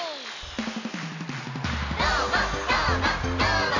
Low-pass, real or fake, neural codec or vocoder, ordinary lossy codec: 7.2 kHz; real; none; none